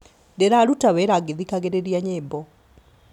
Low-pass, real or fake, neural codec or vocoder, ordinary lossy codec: 19.8 kHz; real; none; none